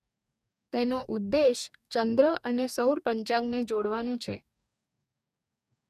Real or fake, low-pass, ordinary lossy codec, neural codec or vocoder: fake; 14.4 kHz; none; codec, 44.1 kHz, 2.6 kbps, DAC